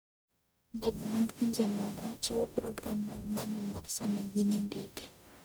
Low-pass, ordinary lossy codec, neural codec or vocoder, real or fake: none; none; codec, 44.1 kHz, 0.9 kbps, DAC; fake